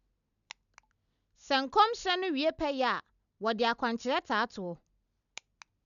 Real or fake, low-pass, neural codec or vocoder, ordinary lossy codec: real; 7.2 kHz; none; none